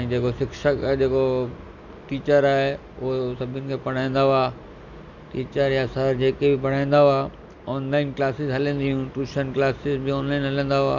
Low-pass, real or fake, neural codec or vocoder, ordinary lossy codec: 7.2 kHz; real; none; none